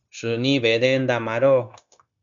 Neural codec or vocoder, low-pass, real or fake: codec, 16 kHz, 0.9 kbps, LongCat-Audio-Codec; 7.2 kHz; fake